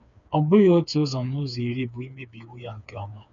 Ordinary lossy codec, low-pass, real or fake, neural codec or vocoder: none; 7.2 kHz; fake; codec, 16 kHz, 4 kbps, FreqCodec, smaller model